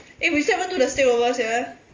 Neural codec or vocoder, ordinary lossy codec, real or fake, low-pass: none; Opus, 32 kbps; real; 7.2 kHz